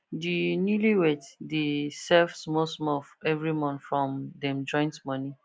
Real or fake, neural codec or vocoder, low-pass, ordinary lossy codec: real; none; none; none